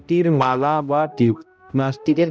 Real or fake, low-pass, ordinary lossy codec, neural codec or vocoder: fake; none; none; codec, 16 kHz, 0.5 kbps, X-Codec, HuBERT features, trained on balanced general audio